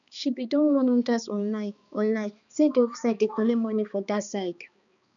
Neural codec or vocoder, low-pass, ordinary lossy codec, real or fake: codec, 16 kHz, 2 kbps, X-Codec, HuBERT features, trained on balanced general audio; 7.2 kHz; none; fake